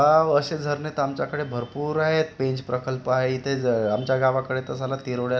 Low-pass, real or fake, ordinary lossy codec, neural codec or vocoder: none; real; none; none